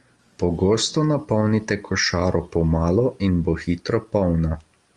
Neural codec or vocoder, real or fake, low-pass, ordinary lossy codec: none; real; 10.8 kHz; Opus, 32 kbps